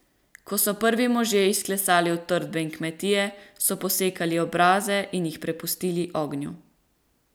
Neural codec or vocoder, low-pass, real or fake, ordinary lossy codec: none; none; real; none